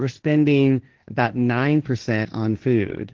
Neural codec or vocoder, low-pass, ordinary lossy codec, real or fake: codec, 16 kHz, 1.1 kbps, Voila-Tokenizer; 7.2 kHz; Opus, 16 kbps; fake